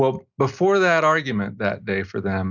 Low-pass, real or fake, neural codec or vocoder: 7.2 kHz; real; none